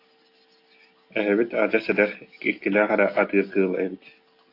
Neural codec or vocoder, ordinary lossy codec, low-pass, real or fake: none; AAC, 32 kbps; 5.4 kHz; real